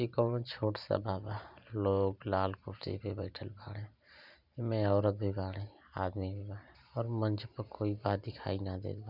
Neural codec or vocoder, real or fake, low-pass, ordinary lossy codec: vocoder, 44.1 kHz, 128 mel bands every 512 samples, BigVGAN v2; fake; 5.4 kHz; none